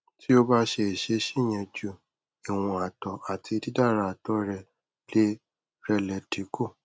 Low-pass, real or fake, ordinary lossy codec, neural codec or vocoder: none; real; none; none